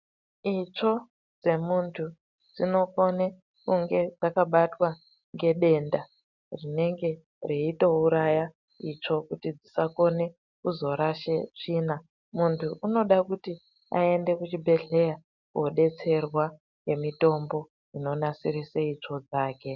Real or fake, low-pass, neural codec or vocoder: real; 7.2 kHz; none